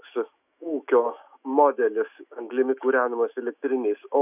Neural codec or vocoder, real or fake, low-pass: autoencoder, 48 kHz, 128 numbers a frame, DAC-VAE, trained on Japanese speech; fake; 3.6 kHz